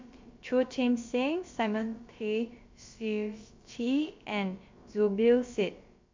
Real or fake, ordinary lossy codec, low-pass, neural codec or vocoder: fake; MP3, 48 kbps; 7.2 kHz; codec, 16 kHz, about 1 kbps, DyCAST, with the encoder's durations